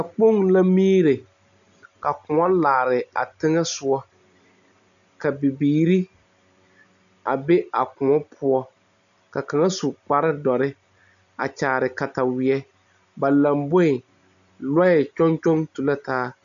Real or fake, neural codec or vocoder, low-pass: real; none; 7.2 kHz